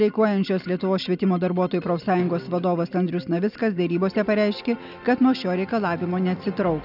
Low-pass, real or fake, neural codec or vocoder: 5.4 kHz; real; none